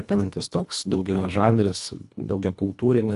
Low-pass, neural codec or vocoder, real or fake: 10.8 kHz; codec, 24 kHz, 1.5 kbps, HILCodec; fake